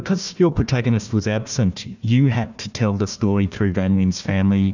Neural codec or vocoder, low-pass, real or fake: codec, 16 kHz, 1 kbps, FunCodec, trained on Chinese and English, 50 frames a second; 7.2 kHz; fake